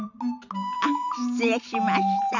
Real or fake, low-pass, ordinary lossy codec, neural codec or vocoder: fake; 7.2 kHz; none; vocoder, 44.1 kHz, 128 mel bands every 256 samples, BigVGAN v2